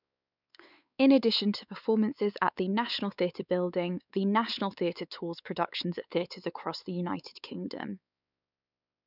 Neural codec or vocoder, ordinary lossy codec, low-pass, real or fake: codec, 16 kHz, 4 kbps, X-Codec, WavLM features, trained on Multilingual LibriSpeech; none; 5.4 kHz; fake